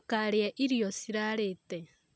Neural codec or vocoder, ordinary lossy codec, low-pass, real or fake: none; none; none; real